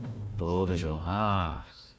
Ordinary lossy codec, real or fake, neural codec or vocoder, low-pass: none; fake; codec, 16 kHz, 1 kbps, FunCodec, trained on Chinese and English, 50 frames a second; none